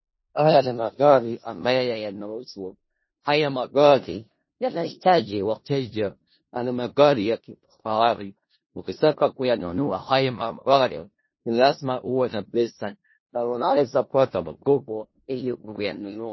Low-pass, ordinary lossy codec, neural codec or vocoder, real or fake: 7.2 kHz; MP3, 24 kbps; codec, 16 kHz in and 24 kHz out, 0.4 kbps, LongCat-Audio-Codec, four codebook decoder; fake